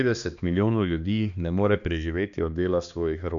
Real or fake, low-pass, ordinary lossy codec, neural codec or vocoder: fake; 7.2 kHz; none; codec, 16 kHz, 2 kbps, X-Codec, HuBERT features, trained on balanced general audio